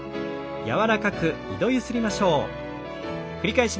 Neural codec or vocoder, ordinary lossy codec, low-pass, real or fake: none; none; none; real